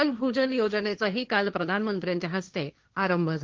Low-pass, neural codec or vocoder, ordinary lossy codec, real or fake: 7.2 kHz; codec, 16 kHz, 1.1 kbps, Voila-Tokenizer; Opus, 32 kbps; fake